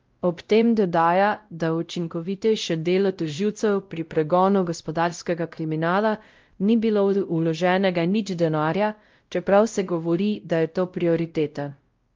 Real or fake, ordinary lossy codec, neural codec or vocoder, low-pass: fake; Opus, 32 kbps; codec, 16 kHz, 0.5 kbps, X-Codec, WavLM features, trained on Multilingual LibriSpeech; 7.2 kHz